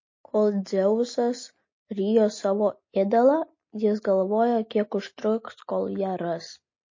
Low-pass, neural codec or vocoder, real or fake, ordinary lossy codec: 7.2 kHz; none; real; MP3, 32 kbps